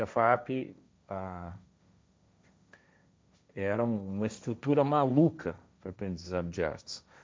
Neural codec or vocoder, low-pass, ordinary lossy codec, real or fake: codec, 16 kHz, 1.1 kbps, Voila-Tokenizer; none; none; fake